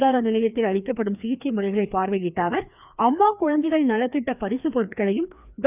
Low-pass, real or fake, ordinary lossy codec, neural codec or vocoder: 3.6 kHz; fake; none; codec, 16 kHz, 2 kbps, FreqCodec, larger model